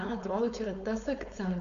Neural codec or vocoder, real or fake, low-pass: codec, 16 kHz, 4.8 kbps, FACodec; fake; 7.2 kHz